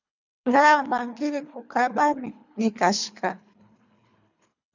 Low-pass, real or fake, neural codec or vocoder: 7.2 kHz; fake; codec, 24 kHz, 3 kbps, HILCodec